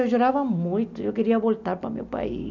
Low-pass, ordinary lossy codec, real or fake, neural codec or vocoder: 7.2 kHz; none; real; none